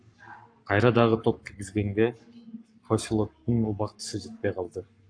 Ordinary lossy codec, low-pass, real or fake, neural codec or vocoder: AAC, 64 kbps; 9.9 kHz; fake; codec, 44.1 kHz, 7.8 kbps, Pupu-Codec